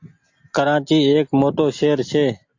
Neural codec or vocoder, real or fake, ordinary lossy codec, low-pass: none; real; AAC, 48 kbps; 7.2 kHz